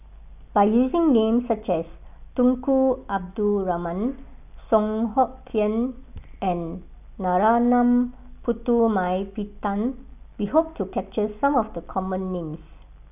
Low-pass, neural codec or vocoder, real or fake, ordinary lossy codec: 3.6 kHz; none; real; none